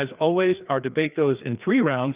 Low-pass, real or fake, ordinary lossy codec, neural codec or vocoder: 3.6 kHz; fake; Opus, 24 kbps; codec, 16 kHz, 2 kbps, FreqCodec, larger model